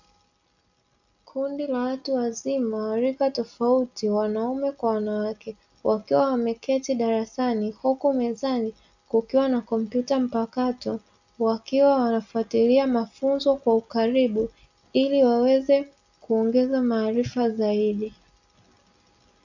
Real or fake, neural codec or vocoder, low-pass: real; none; 7.2 kHz